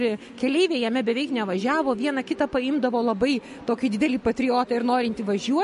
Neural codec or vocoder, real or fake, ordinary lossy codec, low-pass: none; real; MP3, 48 kbps; 14.4 kHz